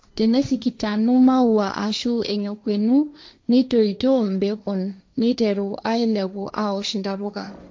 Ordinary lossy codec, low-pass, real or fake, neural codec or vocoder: none; none; fake; codec, 16 kHz, 1.1 kbps, Voila-Tokenizer